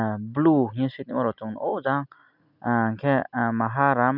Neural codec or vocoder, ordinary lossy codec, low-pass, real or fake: none; none; 5.4 kHz; real